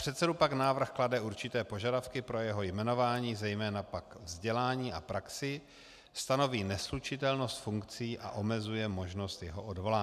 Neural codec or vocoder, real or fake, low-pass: none; real; 14.4 kHz